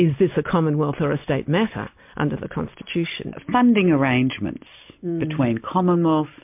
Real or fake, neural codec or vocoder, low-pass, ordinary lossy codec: real; none; 3.6 kHz; MP3, 32 kbps